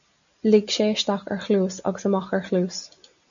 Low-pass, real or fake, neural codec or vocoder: 7.2 kHz; real; none